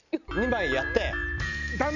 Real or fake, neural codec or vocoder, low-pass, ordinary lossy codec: real; none; 7.2 kHz; none